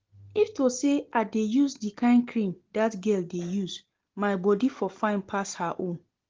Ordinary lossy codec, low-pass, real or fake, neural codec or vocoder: Opus, 16 kbps; 7.2 kHz; real; none